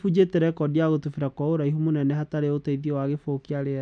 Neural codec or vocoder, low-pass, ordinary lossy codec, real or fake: none; 9.9 kHz; none; real